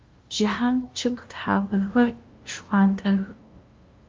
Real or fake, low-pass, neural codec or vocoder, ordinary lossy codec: fake; 7.2 kHz; codec, 16 kHz, 0.5 kbps, FunCodec, trained on LibriTTS, 25 frames a second; Opus, 24 kbps